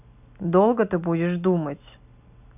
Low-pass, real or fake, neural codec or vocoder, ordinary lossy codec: 3.6 kHz; real; none; none